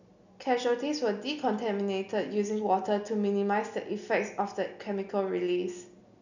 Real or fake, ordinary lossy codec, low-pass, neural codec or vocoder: real; none; 7.2 kHz; none